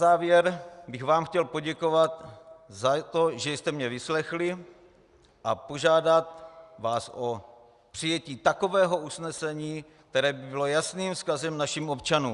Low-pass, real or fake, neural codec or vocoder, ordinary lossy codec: 10.8 kHz; real; none; Opus, 64 kbps